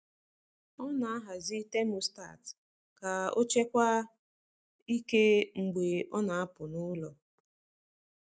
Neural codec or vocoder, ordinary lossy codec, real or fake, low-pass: none; none; real; none